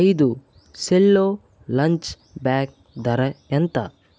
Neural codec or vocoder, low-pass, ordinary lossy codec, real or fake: none; none; none; real